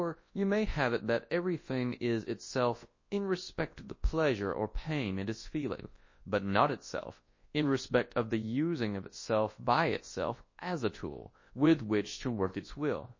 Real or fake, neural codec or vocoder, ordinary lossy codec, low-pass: fake; codec, 24 kHz, 0.9 kbps, WavTokenizer, large speech release; MP3, 32 kbps; 7.2 kHz